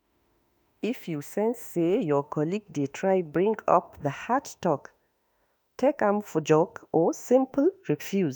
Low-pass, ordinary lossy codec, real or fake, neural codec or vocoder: none; none; fake; autoencoder, 48 kHz, 32 numbers a frame, DAC-VAE, trained on Japanese speech